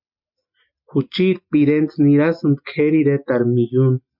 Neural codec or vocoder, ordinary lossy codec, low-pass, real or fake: none; MP3, 48 kbps; 5.4 kHz; real